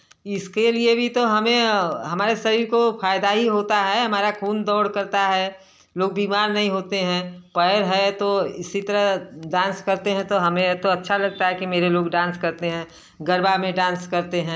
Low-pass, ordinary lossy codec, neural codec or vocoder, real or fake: none; none; none; real